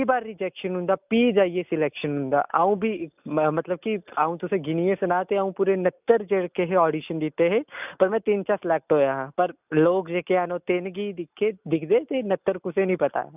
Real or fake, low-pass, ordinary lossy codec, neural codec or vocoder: real; 3.6 kHz; none; none